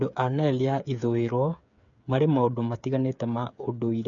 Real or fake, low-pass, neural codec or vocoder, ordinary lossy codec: fake; 7.2 kHz; codec, 16 kHz, 8 kbps, FreqCodec, smaller model; none